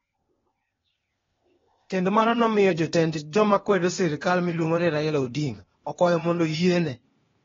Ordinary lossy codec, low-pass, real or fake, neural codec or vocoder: AAC, 24 kbps; 7.2 kHz; fake; codec, 16 kHz, 0.8 kbps, ZipCodec